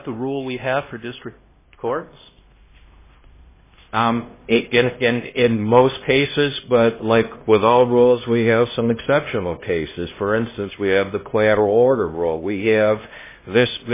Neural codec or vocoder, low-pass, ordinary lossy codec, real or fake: codec, 16 kHz, 1 kbps, X-Codec, HuBERT features, trained on LibriSpeech; 3.6 kHz; MP3, 16 kbps; fake